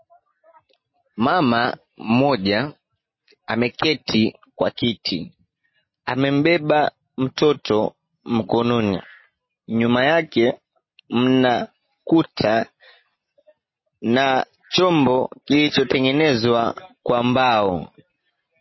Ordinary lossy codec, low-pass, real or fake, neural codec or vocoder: MP3, 24 kbps; 7.2 kHz; real; none